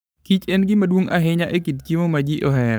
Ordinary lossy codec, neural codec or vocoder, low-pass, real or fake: none; codec, 44.1 kHz, 7.8 kbps, Pupu-Codec; none; fake